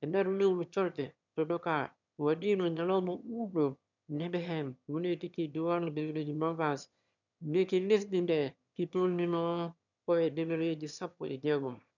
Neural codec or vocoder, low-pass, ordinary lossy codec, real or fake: autoencoder, 22.05 kHz, a latent of 192 numbers a frame, VITS, trained on one speaker; 7.2 kHz; none; fake